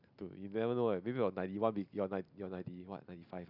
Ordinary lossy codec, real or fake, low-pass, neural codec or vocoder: none; real; 5.4 kHz; none